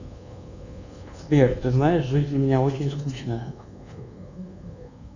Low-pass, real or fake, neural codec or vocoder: 7.2 kHz; fake; codec, 24 kHz, 1.2 kbps, DualCodec